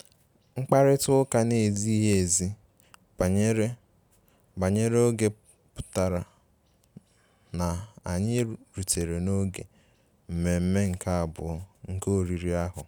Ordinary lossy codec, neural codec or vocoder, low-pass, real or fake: none; none; none; real